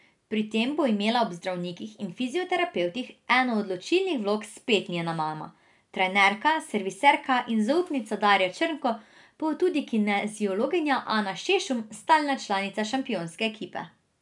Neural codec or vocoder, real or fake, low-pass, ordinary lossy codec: none; real; 10.8 kHz; none